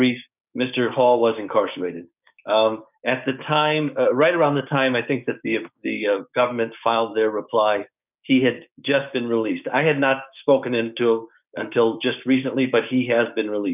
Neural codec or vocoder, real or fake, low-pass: codec, 16 kHz, 6 kbps, DAC; fake; 3.6 kHz